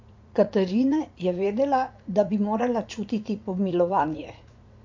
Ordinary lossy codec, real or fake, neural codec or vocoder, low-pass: MP3, 48 kbps; fake; vocoder, 44.1 kHz, 80 mel bands, Vocos; 7.2 kHz